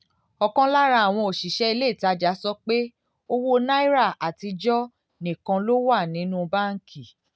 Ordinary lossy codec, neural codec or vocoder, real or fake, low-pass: none; none; real; none